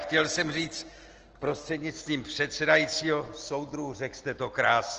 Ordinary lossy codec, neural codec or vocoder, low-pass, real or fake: Opus, 16 kbps; none; 7.2 kHz; real